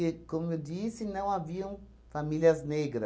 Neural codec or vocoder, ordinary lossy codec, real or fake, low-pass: none; none; real; none